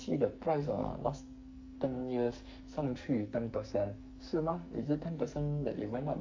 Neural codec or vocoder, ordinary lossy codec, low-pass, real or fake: codec, 44.1 kHz, 2.6 kbps, SNAC; MP3, 48 kbps; 7.2 kHz; fake